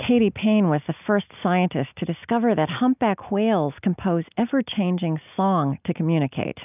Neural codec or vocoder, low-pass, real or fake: none; 3.6 kHz; real